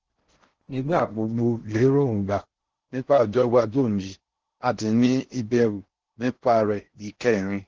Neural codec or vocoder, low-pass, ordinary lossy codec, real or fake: codec, 16 kHz in and 24 kHz out, 0.6 kbps, FocalCodec, streaming, 4096 codes; 7.2 kHz; Opus, 16 kbps; fake